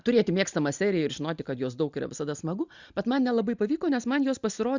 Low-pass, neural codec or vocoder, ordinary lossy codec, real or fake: 7.2 kHz; none; Opus, 64 kbps; real